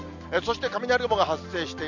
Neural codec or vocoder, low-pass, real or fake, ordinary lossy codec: none; 7.2 kHz; real; none